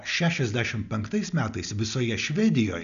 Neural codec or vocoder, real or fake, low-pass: none; real; 7.2 kHz